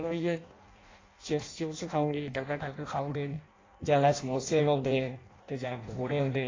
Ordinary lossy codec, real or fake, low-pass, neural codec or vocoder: AAC, 32 kbps; fake; 7.2 kHz; codec, 16 kHz in and 24 kHz out, 0.6 kbps, FireRedTTS-2 codec